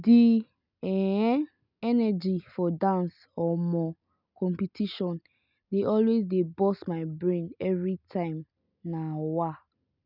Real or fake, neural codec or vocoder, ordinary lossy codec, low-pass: real; none; none; 5.4 kHz